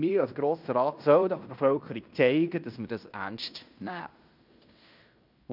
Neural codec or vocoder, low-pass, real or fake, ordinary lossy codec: codec, 16 kHz in and 24 kHz out, 0.9 kbps, LongCat-Audio-Codec, fine tuned four codebook decoder; 5.4 kHz; fake; none